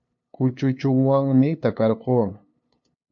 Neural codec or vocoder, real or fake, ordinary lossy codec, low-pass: codec, 16 kHz, 2 kbps, FunCodec, trained on LibriTTS, 25 frames a second; fake; AAC, 64 kbps; 7.2 kHz